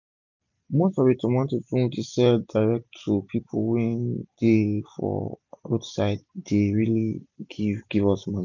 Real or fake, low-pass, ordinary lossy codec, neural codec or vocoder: real; 7.2 kHz; none; none